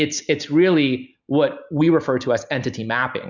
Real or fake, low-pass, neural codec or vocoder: real; 7.2 kHz; none